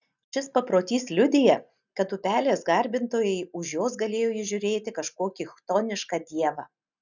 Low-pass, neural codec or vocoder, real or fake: 7.2 kHz; none; real